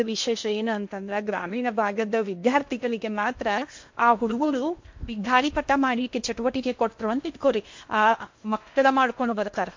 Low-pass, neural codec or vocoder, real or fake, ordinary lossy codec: 7.2 kHz; codec, 16 kHz in and 24 kHz out, 0.8 kbps, FocalCodec, streaming, 65536 codes; fake; MP3, 48 kbps